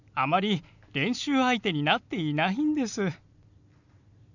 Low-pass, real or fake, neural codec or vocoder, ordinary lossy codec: 7.2 kHz; real; none; none